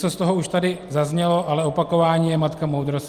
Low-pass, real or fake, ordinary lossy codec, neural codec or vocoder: 14.4 kHz; real; Opus, 32 kbps; none